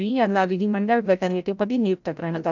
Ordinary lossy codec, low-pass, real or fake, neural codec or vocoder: none; 7.2 kHz; fake; codec, 16 kHz, 0.5 kbps, FreqCodec, larger model